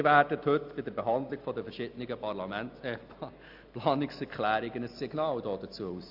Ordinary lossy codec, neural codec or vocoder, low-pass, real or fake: AAC, 48 kbps; none; 5.4 kHz; real